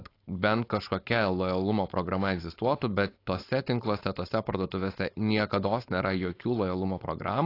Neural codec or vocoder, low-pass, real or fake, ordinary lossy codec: codec, 16 kHz, 4.8 kbps, FACodec; 5.4 kHz; fake; AAC, 32 kbps